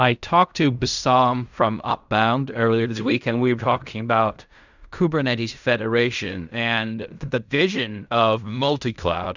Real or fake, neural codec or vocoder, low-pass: fake; codec, 16 kHz in and 24 kHz out, 0.4 kbps, LongCat-Audio-Codec, fine tuned four codebook decoder; 7.2 kHz